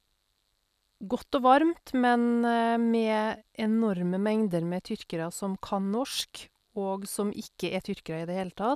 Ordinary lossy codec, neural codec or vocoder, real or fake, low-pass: none; none; real; 14.4 kHz